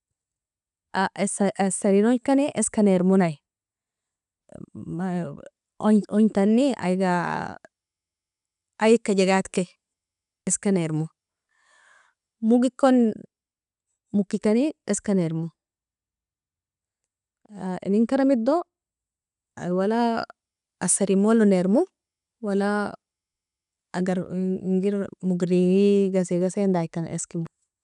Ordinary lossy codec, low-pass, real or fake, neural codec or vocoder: none; 10.8 kHz; real; none